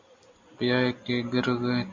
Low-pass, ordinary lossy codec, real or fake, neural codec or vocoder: 7.2 kHz; MP3, 64 kbps; real; none